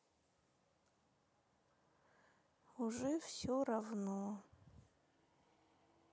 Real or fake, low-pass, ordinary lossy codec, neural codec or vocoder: real; none; none; none